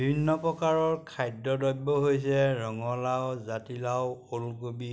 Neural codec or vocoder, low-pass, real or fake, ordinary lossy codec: none; none; real; none